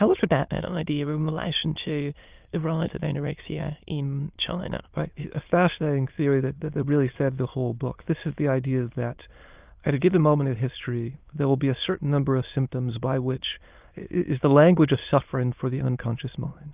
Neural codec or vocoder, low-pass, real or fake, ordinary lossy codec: autoencoder, 22.05 kHz, a latent of 192 numbers a frame, VITS, trained on many speakers; 3.6 kHz; fake; Opus, 32 kbps